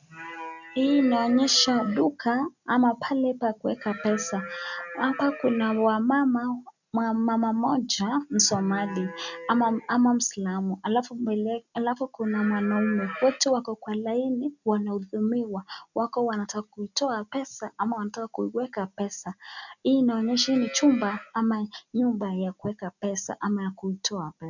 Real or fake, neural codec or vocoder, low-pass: real; none; 7.2 kHz